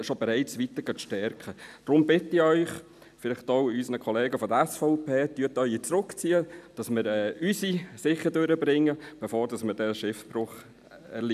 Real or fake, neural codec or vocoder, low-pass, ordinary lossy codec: real; none; 14.4 kHz; none